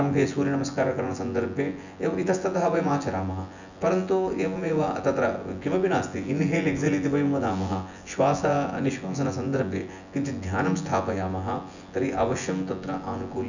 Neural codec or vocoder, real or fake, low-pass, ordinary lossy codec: vocoder, 24 kHz, 100 mel bands, Vocos; fake; 7.2 kHz; none